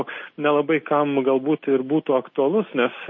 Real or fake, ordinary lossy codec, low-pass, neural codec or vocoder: real; MP3, 32 kbps; 7.2 kHz; none